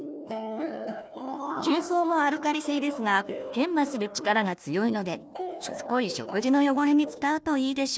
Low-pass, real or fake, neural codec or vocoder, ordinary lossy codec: none; fake; codec, 16 kHz, 1 kbps, FunCodec, trained on Chinese and English, 50 frames a second; none